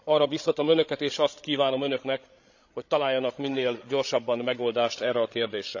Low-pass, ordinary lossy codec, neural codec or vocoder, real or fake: 7.2 kHz; none; codec, 16 kHz, 16 kbps, FreqCodec, larger model; fake